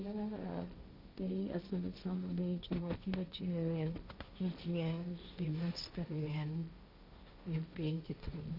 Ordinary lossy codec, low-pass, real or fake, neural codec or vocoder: none; 5.4 kHz; fake; codec, 16 kHz, 1.1 kbps, Voila-Tokenizer